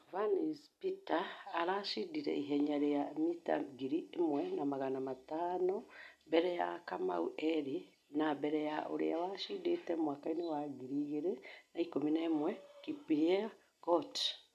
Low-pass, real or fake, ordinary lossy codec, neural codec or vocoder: 14.4 kHz; real; none; none